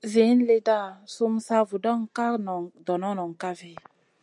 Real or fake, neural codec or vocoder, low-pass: real; none; 10.8 kHz